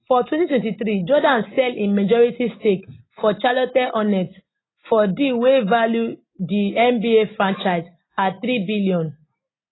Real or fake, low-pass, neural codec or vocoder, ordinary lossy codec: real; 7.2 kHz; none; AAC, 16 kbps